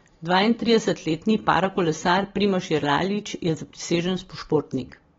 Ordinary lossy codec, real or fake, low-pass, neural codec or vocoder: AAC, 24 kbps; real; 7.2 kHz; none